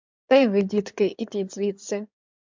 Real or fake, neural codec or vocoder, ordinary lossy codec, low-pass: fake; codec, 16 kHz in and 24 kHz out, 2.2 kbps, FireRedTTS-2 codec; MP3, 64 kbps; 7.2 kHz